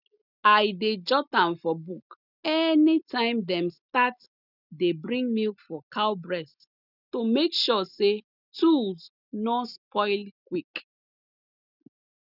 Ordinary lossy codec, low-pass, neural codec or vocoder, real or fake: none; 5.4 kHz; none; real